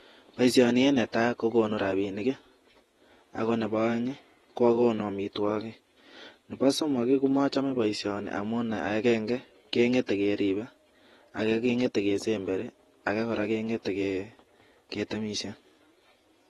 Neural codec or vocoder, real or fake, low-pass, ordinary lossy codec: vocoder, 48 kHz, 128 mel bands, Vocos; fake; 19.8 kHz; AAC, 32 kbps